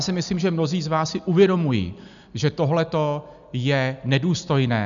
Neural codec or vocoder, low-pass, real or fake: none; 7.2 kHz; real